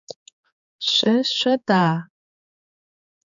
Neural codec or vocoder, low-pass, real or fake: codec, 16 kHz, 4 kbps, X-Codec, HuBERT features, trained on general audio; 7.2 kHz; fake